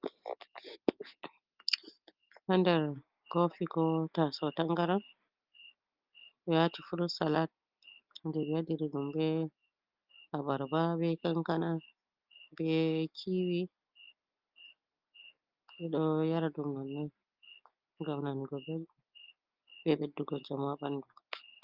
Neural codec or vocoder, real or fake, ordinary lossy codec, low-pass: none; real; Opus, 24 kbps; 5.4 kHz